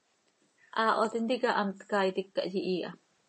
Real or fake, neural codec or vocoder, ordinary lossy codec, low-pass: real; none; MP3, 32 kbps; 10.8 kHz